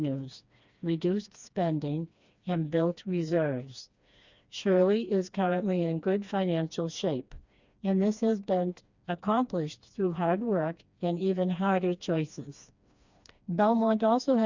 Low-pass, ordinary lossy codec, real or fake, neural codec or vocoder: 7.2 kHz; Opus, 64 kbps; fake; codec, 16 kHz, 2 kbps, FreqCodec, smaller model